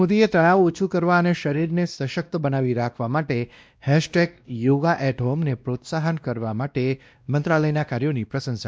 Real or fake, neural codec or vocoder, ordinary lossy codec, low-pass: fake; codec, 16 kHz, 1 kbps, X-Codec, WavLM features, trained on Multilingual LibriSpeech; none; none